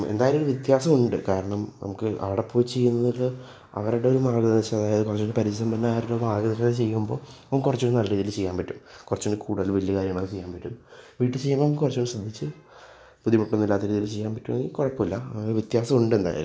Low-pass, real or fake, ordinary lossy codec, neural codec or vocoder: none; real; none; none